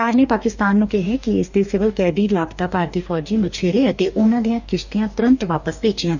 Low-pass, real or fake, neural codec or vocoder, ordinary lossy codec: 7.2 kHz; fake; codec, 44.1 kHz, 2.6 kbps, DAC; none